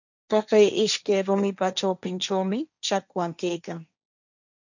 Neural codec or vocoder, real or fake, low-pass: codec, 16 kHz, 1.1 kbps, Voila-Tokenizer; fake; 7.2 kHz